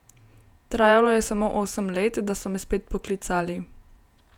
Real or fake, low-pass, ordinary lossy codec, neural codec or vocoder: fake; 19.8 kHz; none; vocoder, 48 kHz, 128 mel bands, Vocos